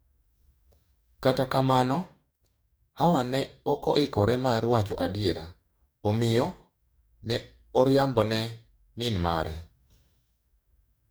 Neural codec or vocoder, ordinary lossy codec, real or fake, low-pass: codec, 44.1 kHz, 2.6 kbps, DAC; none; fake; none